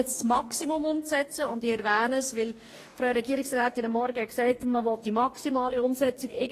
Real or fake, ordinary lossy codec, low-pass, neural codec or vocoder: fake; AAC, 48 kbps; 14.4 kHz; codec, 44.1 kHz, 2.6 kbps, DAC